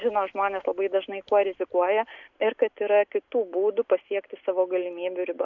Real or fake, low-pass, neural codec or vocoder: real; 7.2 kHz; none